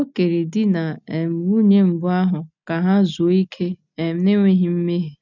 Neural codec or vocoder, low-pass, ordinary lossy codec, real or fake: none; 7.2 kHz; none; real